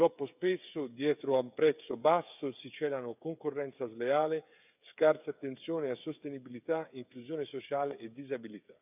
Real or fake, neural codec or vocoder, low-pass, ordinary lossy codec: fake; codec, 16 kHz, 8 kbps, FreqCodec, smaller model; 3.6 kHz; none